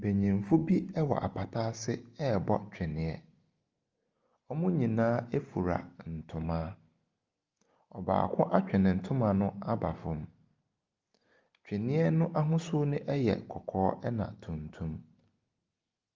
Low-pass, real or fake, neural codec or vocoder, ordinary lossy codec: 7.2 kHz; real; none; Opus, 24 kbps